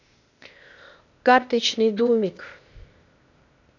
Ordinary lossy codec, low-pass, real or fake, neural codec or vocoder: none; 7.2 kHz; fake; codec, 16 kHz, 0.8 kbps, ZipCodec